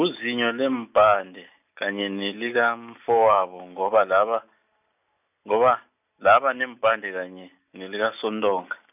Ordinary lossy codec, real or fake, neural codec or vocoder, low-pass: none; real; none; 3.6 kHz